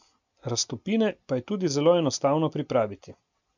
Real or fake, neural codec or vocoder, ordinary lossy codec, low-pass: real; none; none; 7.2 kHz